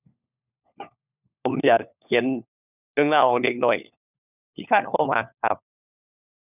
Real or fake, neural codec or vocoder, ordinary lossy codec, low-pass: fake; codec, 16 kHz, 4 kbps, FunCodec, trained on LibriTTS, 50 frames a second; none; 3.6 kHz